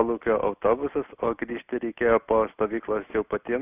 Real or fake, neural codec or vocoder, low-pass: real; none; 3.6 kHz